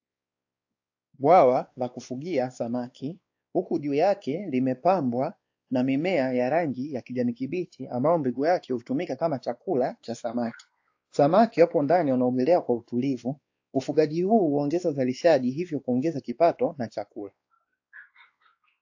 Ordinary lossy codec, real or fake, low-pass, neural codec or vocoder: AAC, 48 kbps; fake; 7.2 kHz; codec, 16 kHz, 2 kbps, X-Codec, WavLM features, trained on Multilingual LibriSpeech